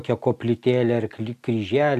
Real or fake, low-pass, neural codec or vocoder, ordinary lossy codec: real; 14.4 kHz; none; AAC, 96 kbps